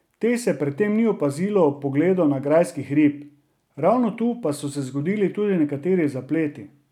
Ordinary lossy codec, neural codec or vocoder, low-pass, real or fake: none; none; 19.8 kHz; real